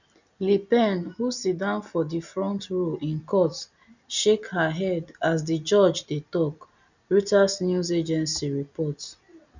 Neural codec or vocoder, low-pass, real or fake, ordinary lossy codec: none; 7.2 kHz; real; none